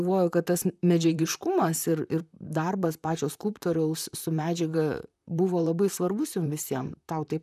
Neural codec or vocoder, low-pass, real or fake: vocoder, 44.1 kHz, 128 mel bands, Pupu-Vocoder; 14.4 kHz; fake